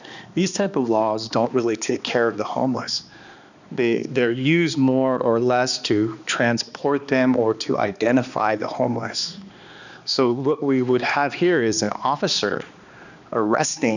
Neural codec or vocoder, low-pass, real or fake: codec, 16 kHz, 2 kbps, X-Codec, HuBERT features, trained on balanced general audio; 7.2 kHz; fake